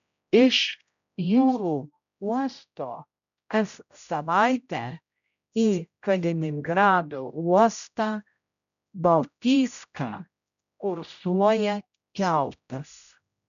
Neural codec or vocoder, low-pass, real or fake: codec, 16 kHz, 0.5 kbps, X-Codec, HuBERT features, trained on general audio; 7.2 kHz; fake